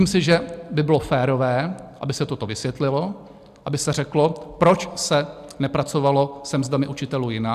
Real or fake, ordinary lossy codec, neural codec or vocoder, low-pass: real; AAC, 96 kbps; none; 14.4 kHz